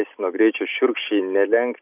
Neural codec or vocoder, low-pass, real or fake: none; 3.6 kHz; real